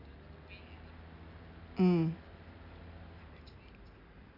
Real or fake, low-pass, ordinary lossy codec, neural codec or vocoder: real; 5.4 kHz; none; none